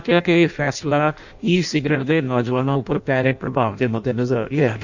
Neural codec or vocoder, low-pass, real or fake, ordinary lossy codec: codec, 16 kHz in and 24 kHz out, 0.6 kbps, FireRedTTS-2 codec; 7.2 kHz; fake; none